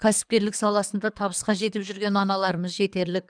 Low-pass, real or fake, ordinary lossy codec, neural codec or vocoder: 9.9 kHz; fake; none; codec, 24 kHz, 3 kbps, HILCodec